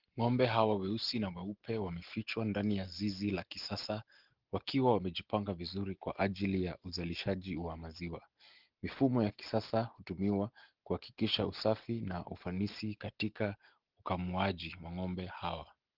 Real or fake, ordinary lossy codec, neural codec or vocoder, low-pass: real; Opus, 16 kbps; none; 5.4 kHz